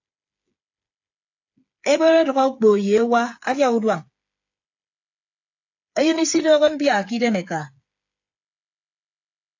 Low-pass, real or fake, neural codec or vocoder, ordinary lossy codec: 7.2 kHz; fake; codec, 16 kHz, 8 kbps, FreqCodec, smaller model; AAC, 48 kbps